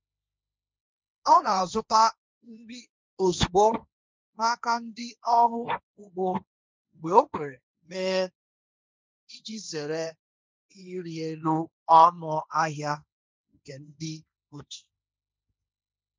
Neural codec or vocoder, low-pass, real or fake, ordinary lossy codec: codec, 16 kHz, 1.1 kbps, Voila-Tokenizer; none; fake; none